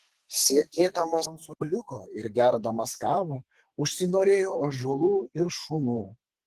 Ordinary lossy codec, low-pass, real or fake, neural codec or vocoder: Opus, 16 kbps; 14.4 kHz; fake; codec, 32 kHz, 1.9 kbps, SNAC